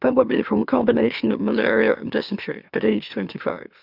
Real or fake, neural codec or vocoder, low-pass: fake; autoencoder, 44.1 kHz, a latent of 192 numbers a frame, MeloTTS; 5.4 kHz